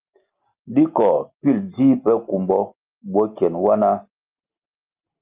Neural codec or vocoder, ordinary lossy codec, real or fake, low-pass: none; Opus, 32 kbps; real; 3.6 kHz